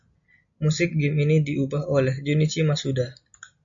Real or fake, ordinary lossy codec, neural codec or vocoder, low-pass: real; MP3, 48 kbps; none; 7.2 kHz